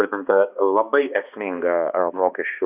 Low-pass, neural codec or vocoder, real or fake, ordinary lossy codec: 3.6 kHz; codec, 16 kHz, 2 kbps, X-Codec, HuBERT features, trained on balanced general audio; fake; Opus, 64 kbps